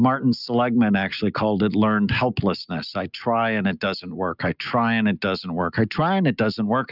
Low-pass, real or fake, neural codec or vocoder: 5.4 kHz; real; none